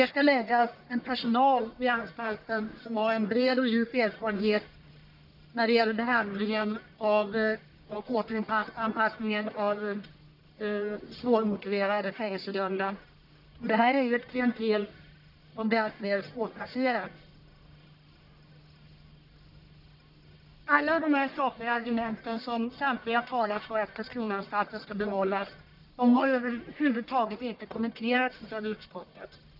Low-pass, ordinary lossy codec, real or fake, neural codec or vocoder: 5.4 kHz; none; fake; codec, 44.1 kHz, 1.7 kbps, Pupu-Codec